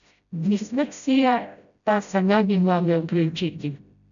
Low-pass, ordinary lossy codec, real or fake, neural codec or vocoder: 7.2 kHz; none; fake; codec, 16 kHz, 0.5 kbps, FreqCodec, smaller model